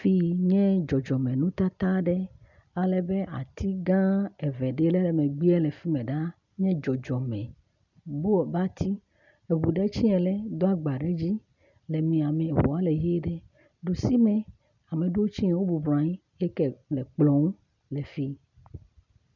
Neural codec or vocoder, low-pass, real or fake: none; 7.2 kHz; real